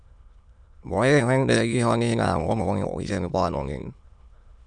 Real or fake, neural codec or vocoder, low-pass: fake; autoencoder, 22.05 kHz, a latent of 192 numbers a frame, VITS, trained on many speakers; 9.9 kHz